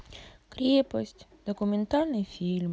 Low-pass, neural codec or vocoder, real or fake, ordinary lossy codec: none; none; real; none